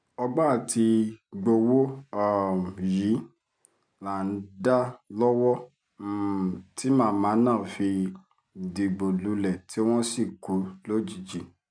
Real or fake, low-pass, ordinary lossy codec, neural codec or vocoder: real; 9.9 kHz; none; none